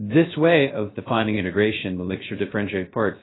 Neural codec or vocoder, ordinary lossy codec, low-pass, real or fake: codec, 16 kHz, 0.3 kbps, FocalCodec; AAC, 16 kbps; 7.2 kHz; fake